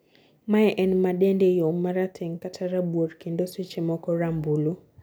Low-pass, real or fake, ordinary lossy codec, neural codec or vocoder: none; real; none; none